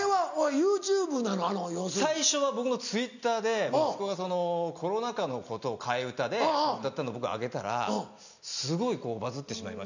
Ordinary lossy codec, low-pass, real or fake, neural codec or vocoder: none; 7.2 kHz; real; none